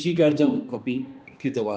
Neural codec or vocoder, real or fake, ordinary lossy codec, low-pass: codec, 16 kHz, 1 kbps, X-Codec, HuBERT features, trained on balanced general audio; fake; none; none